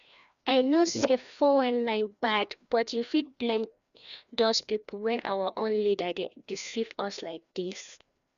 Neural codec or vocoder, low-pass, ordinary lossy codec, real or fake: codec, 16 kHz, 1 kbps, FreqCodec, larger model; 7.2 kHz; none; fake